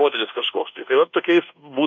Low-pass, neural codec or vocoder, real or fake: 7.2 kHz; codec, 24 kHz, 1.2 kbps, DualCodec; fake